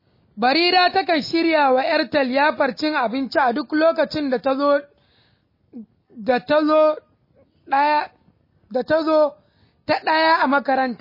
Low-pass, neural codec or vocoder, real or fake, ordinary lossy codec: 5.4 kHz; none; real; MP3, 24 kbps